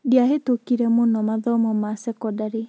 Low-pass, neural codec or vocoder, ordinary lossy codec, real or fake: none; none; none; real